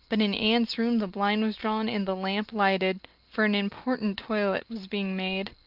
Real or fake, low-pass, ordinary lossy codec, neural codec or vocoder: real; 5.4 kHz; Opus, 32 kbps; none